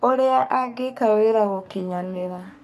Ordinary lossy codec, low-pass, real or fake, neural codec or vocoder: none; 14.4 kHz; fake; codec, 44.1 kHz, 3.4 kbps, Pupu-Codec